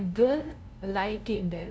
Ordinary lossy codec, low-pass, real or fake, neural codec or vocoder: none; none; fake; codec, 16 kHz, 0.5 kbps, FunCodec, trained on LibriTTS, 25 frames a second